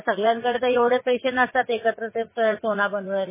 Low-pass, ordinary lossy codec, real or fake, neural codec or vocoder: 3.6 kHz; MP3, 16 kbps; fake; vocoder, 44.1 kHz, 80 mel bands, Vocos